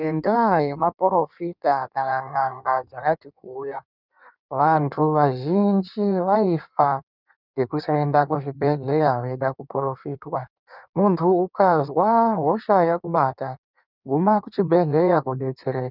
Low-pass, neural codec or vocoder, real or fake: 5.4 kHz; codec, 16 kHz in and 24 kHz out, 1.1 kbps, FireRedTTS-2 codec; fake